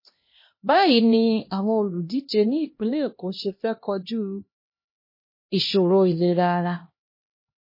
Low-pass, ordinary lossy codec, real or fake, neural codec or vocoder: 5.4 kHz; MP3, 24 kbps; fake; codec, 16 kHz, 1 kbps, X-Codec, HuBERT features, trained on LibriSpeech